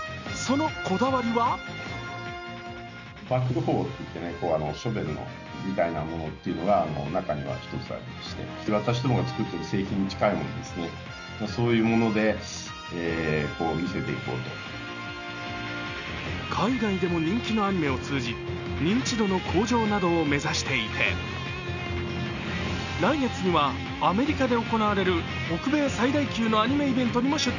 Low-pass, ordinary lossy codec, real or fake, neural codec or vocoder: 7.2 kHz; none; real; none